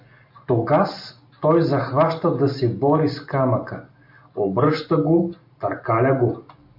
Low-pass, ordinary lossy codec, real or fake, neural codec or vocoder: 5.4 kHz; MP3, 48 kbps; real; none